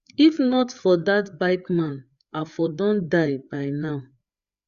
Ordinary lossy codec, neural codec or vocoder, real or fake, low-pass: Opus, 64 kbps; codec, 16 kHz, 4 kbps, FreqCodec, larger model; fake; 7.2 kHz